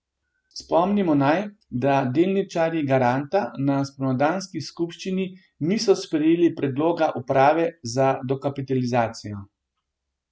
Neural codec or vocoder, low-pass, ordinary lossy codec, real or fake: none; none; none; real